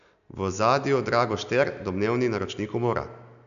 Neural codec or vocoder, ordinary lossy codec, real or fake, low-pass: none; none; real; 7.2 kHz